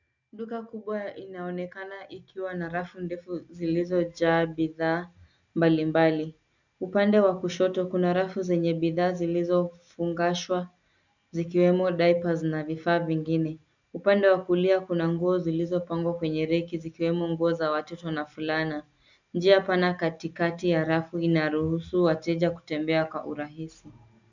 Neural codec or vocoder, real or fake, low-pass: none; real; 7.2 kHz